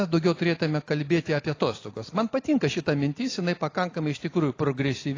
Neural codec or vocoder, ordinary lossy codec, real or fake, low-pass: none; AAC, 32 kbps; real; 7.2 kHz